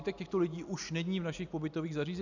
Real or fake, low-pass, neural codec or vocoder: real; 7.2 kHz; none